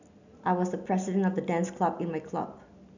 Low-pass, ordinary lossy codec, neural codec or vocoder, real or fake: 7.2 kHz; none; none; real